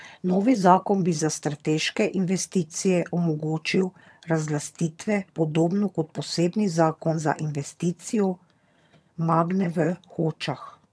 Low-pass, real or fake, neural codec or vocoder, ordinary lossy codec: none; fake; vocoder, 22.05 kHz, 80 mel bands, HiFi-GAN; none